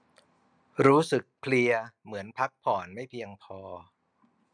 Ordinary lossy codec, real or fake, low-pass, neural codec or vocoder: none; real; 9.9 kHz; none